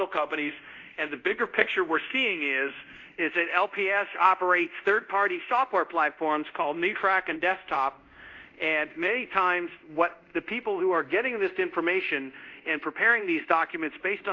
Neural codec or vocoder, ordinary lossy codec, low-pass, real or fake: codec, 24 kHz, 0.5 kbps, DualCodec; AAC, 48 kbps; 7.2 kHz; fake